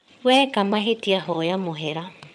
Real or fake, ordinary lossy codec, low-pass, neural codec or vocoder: fake; none; none; vocoder, 22.05 kHz, 80 mel bands, HiFi-GAN